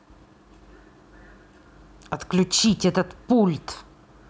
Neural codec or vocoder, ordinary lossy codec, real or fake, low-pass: none; none; real; none